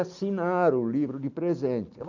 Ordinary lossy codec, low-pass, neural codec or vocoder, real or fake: none; 7.2 kHz; none; real